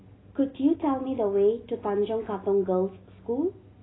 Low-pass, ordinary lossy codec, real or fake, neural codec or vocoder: 7.2 kHz; AAC, 16 kbps; real; none